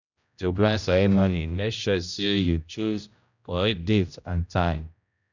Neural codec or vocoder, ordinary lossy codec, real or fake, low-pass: codec, 16 kHz, 0.5 kbps, X-Codec, HuBERT features, trained on general audio; none; fake; 7.2 kHz